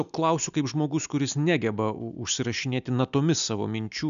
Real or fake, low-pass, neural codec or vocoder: real; 7.2 kHz; none